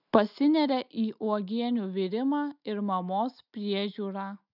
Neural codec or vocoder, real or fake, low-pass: none; real; 5.4 kHz